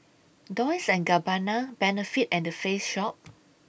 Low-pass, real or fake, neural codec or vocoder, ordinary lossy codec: none; real; none; none